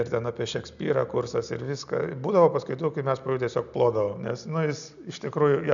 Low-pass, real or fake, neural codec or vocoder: 7.2 kHz; real; none